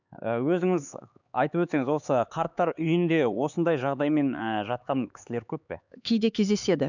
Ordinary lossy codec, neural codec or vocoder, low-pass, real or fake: none; codec, 16 kHz, 4 kbps, X-Codec, HuBERT features, trained on LibriSpeech; 7.2 kHz; fake